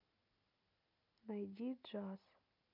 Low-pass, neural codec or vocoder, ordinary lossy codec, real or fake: 5.4 kHz; none; none; real